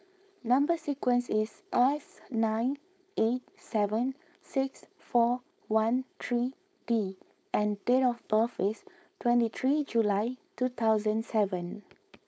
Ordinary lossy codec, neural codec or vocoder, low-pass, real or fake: none; codec, 16 kHz, 4.8 kbps, FACodec; none; fake